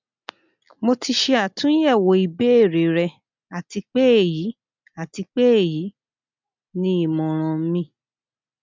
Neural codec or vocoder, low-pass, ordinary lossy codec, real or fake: none; 7.2 kHz; MP3, 64 kbps; real